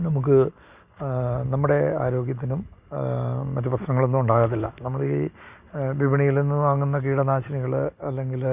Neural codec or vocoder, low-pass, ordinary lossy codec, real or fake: none; 3.6 kHz; Opus, 64 kbps; real